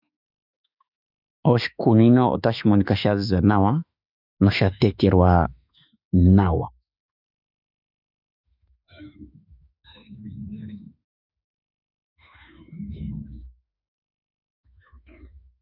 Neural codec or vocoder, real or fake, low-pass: autoencoder, 48 kHz, 32 numbers a frame, DAC-VAE, trained on Japanese speech; fake; 5.4 kHz